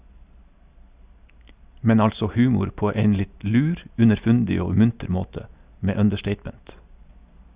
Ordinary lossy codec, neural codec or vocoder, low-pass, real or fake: Opus, 64 kbps; none; 3.6 kHz; real